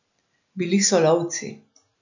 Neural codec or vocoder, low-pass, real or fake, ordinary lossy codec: none; 7.2 kHz; real; none